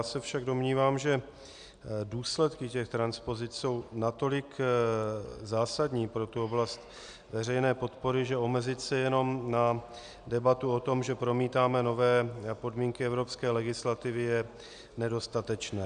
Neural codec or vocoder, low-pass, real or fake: none; 9.9 kHz; real